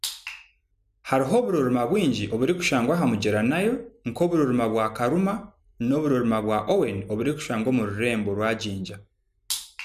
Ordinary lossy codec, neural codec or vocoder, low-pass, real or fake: none; none; 14.4 kHz; real